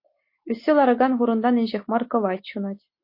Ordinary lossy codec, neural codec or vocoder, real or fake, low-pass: MP3, 32 kbps; none; real; 5.4 kHz